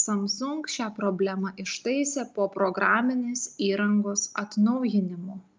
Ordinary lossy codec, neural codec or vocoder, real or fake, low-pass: Opus, 24 kbps; none; real; 7.2 kHz